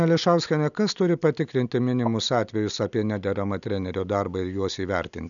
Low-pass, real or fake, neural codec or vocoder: 7.2 kHz; real; none